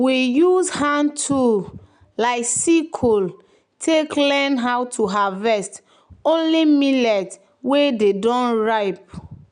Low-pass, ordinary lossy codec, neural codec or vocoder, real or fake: 9.9 kHz; none; none; real